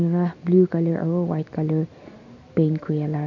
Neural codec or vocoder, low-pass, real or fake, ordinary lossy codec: none; 7.2 kHz; real; none